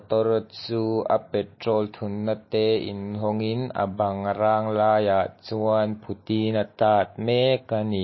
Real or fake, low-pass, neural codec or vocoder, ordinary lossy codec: real; 7.2 kHz; none; MP3, 24 kbps